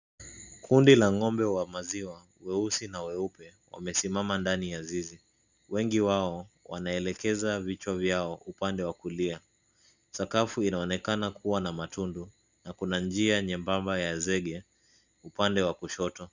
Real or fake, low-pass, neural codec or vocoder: real; 7.2 kHz; none